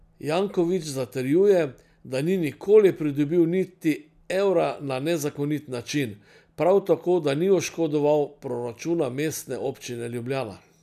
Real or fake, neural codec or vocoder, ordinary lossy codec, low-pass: real; none; none; 14.4 kHz